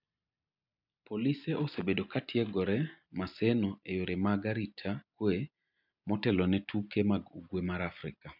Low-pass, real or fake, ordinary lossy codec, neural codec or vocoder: 5.4 kHz; real; none; none